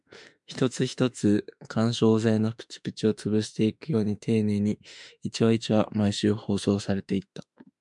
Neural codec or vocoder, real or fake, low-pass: autoencoder, 48 kHz, 32 numbers a frame, DAC-VAE, trained on Japanese speech; fake; 10.8 kHz